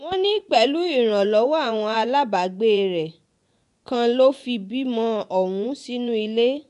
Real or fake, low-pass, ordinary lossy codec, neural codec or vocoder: fake; 10.8 kHz; none; vocoder, 24 kHz, 100 mel bands, Vocos